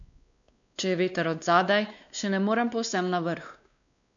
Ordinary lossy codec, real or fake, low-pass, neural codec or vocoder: none; fake; 7.2 kHz; codec, 16 kHz, 2 kbps, X-Codec, WavLM features, trained on Multilingual LibriSpeech